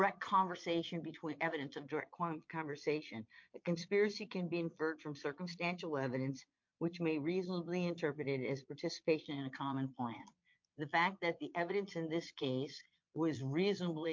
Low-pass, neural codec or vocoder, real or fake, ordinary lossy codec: 7.2 kHz; vocoder, 22.05 kHz, 80 mel bands, Vocos; fake; MP3, 48 kbps